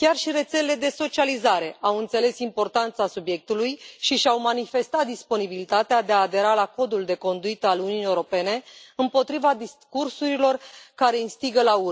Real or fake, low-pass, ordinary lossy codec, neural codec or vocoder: real; none; none; none